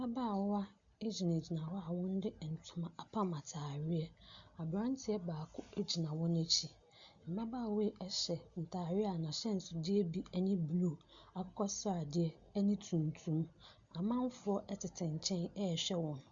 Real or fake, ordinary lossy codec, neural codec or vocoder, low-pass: real; Opus, 64 kbps; none; 7.2 kHz